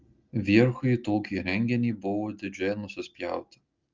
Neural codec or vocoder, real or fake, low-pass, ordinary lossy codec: none; real; 7.2 kHz; Opus, 32 kbps